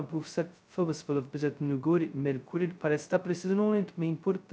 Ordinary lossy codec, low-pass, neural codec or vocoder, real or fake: none; none; codec, 16 kHz, 0.2 kbps, FocalCodec; fake